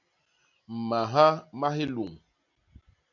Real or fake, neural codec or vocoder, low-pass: real; none; 7.2 kHz